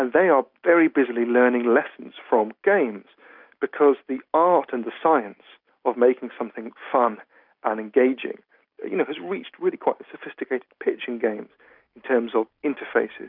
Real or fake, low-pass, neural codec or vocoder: real; 5.4 kHz; none